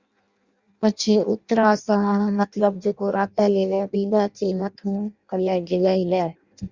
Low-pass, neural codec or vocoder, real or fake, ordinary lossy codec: 7.2 kHz; codec, 16 kHz in and 24 kHz out, 0.6 kbps, FireRedTTS-2 codec; fake; Opus, 64 kbps